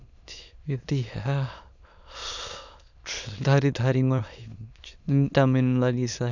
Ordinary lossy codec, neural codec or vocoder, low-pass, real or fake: none; autoencoder, 22.05 kHz, a latent of 192 numbers a frame, VITS, trained on many speakers; 7.2 kHz; fake